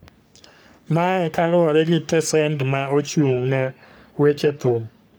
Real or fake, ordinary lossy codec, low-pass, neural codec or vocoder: fake; none; none; codec, 44.1 kHz, 3.4 kbps, Pupu-Codec